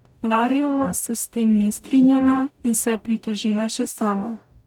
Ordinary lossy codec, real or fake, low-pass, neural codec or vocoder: none; fake; 19.8 kHz; codec, 44.1 kHz, 0.9 kbps, DAC